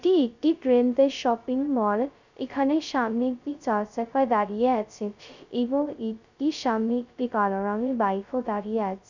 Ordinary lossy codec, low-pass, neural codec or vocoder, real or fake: none; 7.2 kHz; codec, 16 kHz, 0.2 kbps, FocalCodec; fake